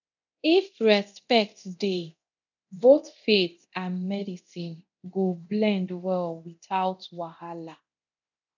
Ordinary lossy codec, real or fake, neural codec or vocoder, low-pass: none; fake; codec, 24 kHz, 0.9 kbps, DualCodec; 7.2 kHz